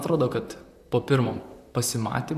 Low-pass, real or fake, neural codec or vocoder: 14.4 kHz; fake; vocoder, 44.1 kHz, 128 mel bands, Pupu-Vocoder